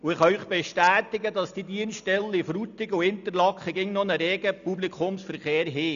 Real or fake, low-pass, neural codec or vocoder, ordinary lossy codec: real; 7.2 kHz; none; none